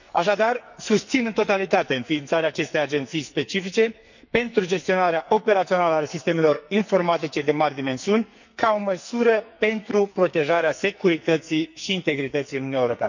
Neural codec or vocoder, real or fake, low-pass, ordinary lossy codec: codec, 44.1 kHz, 2.6 kbps, SNAC; fake; 7.2 kHz; none